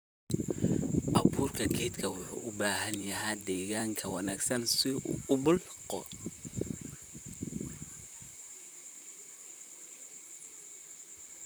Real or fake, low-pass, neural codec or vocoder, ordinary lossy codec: fake; none; vocoder, 44.1 kHz, 128 mel bands, Pupu-Vocoder; none